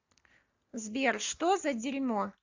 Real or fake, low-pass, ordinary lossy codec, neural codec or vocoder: real; 7.2 kHz; AAC, 48 kbps; none